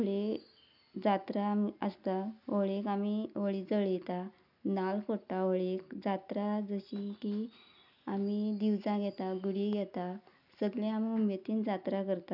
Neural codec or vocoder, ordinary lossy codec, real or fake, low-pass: none; none; real; 5.4 kHz